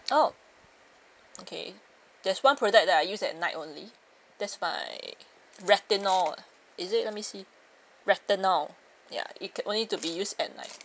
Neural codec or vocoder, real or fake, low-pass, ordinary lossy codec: none; real; none; none